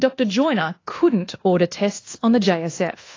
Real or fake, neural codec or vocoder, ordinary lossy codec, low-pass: fake; codec, 24 kHz, 1.2 kbps, DualCodec; AAC, 32 kbps; 7.2 kHz